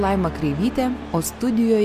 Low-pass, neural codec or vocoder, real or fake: 14.4 kHz; none; real